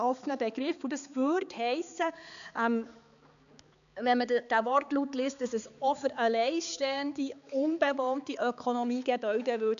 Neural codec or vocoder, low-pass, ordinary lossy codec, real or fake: codec, 16 kHz, 4 kbps, X-Codec, HuBERT features, trained on balanced general audio; 7.2 kHz; none; fake